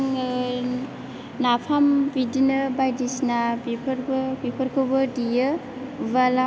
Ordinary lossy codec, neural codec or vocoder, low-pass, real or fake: none; none; none; real